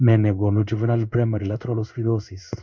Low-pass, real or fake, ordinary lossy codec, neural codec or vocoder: 7.2 kHz; fake; none; codec, 16 kHz in and 24 kHz out, 1 kbps, XY-Tokenizer